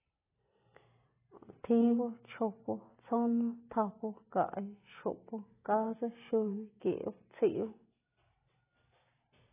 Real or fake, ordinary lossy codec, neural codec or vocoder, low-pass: real; AAC, 16 kbps; none; 3.6 kHz